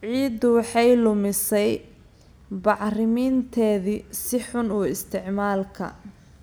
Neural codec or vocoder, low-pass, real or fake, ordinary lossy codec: none; none; real; none